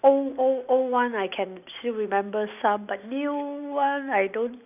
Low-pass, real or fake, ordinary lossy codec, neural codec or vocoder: 3.6 kHz; real; none; none